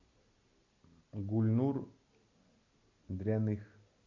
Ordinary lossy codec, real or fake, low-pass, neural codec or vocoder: MP3, 64 kbps; real; 7.2 kHz; none